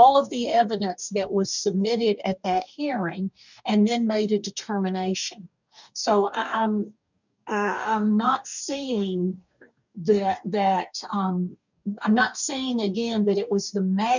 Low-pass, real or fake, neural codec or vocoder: 7.2 kHz; fake; codec, 44.1 kHz, 2.6 kbps, DAC